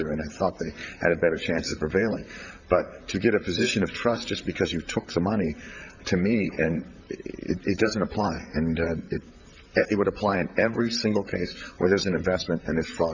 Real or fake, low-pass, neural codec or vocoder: fake; 7.2 kHz; vocoder, 44.1 kHz, 128 mel bands, Pupu-Vocoder